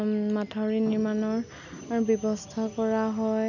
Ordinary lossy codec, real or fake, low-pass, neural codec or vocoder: none; real; 7.2 kHz; none